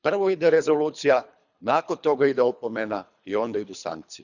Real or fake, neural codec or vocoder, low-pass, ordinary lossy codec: fake; codec, 24 kHz, 3 kbps, HILCodec; 7.2 kHz; none